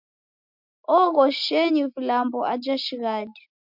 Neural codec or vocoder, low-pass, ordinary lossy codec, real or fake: none; 5.4 kHz; MP3, 48 kbps; real